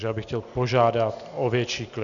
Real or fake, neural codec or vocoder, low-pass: real; none; 7.2 kHz